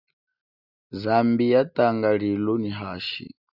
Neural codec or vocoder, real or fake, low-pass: none; real; 5.4 kHz